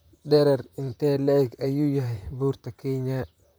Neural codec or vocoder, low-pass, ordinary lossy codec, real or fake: vocoder, 44.1 kHz, 128 mel bands, Pupu-Vocoder; none; none; fake